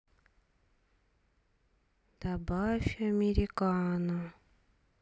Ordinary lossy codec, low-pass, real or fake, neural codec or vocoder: none; none; real; none